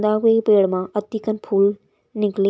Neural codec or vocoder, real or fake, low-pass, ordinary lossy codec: none; real; none; none